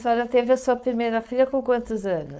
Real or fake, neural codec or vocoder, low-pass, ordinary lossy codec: fake; codec, 16 kHz, 4.8 kbps, FACodec; none; none